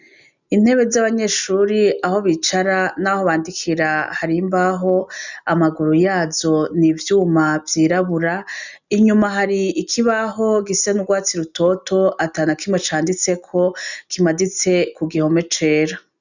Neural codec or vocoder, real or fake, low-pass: none; real; 7.2 kHz